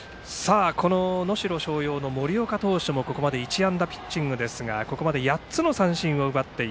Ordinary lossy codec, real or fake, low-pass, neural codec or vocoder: none; real; none; none